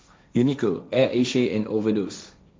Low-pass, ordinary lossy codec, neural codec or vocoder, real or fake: none; none; codec, 16 kHz, 1.1 kbps, Voila-Tokenizer; fake